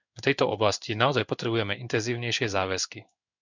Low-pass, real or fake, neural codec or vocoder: 7.2 kHz; fake; codec, 16 kHz in and 24 kHz out, 1 kbps, XY-Tokenizer